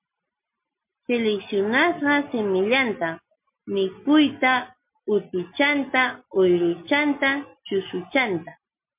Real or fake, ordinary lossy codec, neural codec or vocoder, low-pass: real; MP3, 32 kbps; none; 3.6 kHz